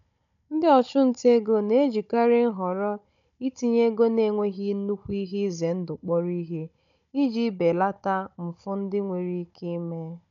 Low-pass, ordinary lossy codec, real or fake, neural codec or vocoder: 7.2 kHz; none; fake; codec, 16 kHz, 16 kbps, FunCodec, trained on Chinese and English, 50 frames a second